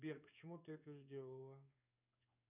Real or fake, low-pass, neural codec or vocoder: fake; 3.6 kHz; codec, 16 kHz in and 24 kHz out, 1 kbps, XY-Tokenizer